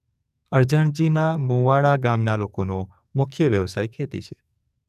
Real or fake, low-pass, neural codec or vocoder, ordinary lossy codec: fake; 14.4 kHz; codec, 44.1 kHz, 2.6 kbps, SNAC; none